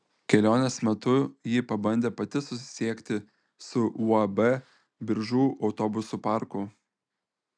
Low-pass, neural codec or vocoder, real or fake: 9.9 kHz; none; real